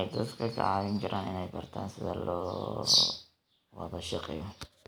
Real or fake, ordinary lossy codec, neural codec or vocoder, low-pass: real; none; none; none